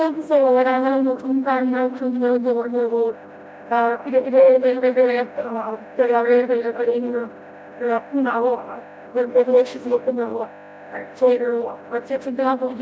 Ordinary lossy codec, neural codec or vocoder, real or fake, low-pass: none; codec, 16 kHz, 0.5 kbps, FreqCodec, smaller model; fake; none